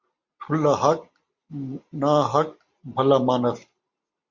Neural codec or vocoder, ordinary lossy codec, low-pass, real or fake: none; Opus, 64 kbps; 7.2 kHz; real